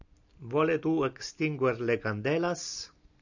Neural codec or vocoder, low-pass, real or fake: none; 7.2 kHz; real